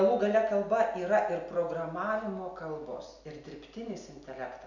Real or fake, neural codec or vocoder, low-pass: real; none; 7.2 kHz